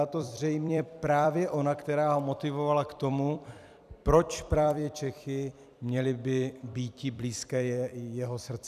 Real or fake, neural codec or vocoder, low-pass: fake; vocoder, 44.1 kHz, 128 mel bands every 256 samples, BigVGAN v2; 14.4 kHz